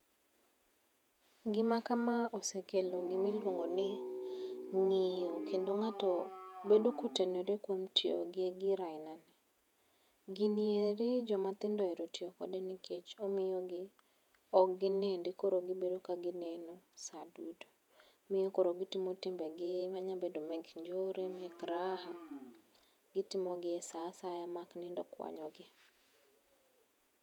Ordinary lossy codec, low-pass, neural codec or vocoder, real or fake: none; 19.8 kHz; vocoder, 48 kHz, 128 mel bands, Vocos; fake